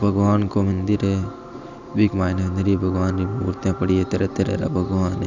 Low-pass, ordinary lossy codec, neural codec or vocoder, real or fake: 7.2 kHz; none; none; real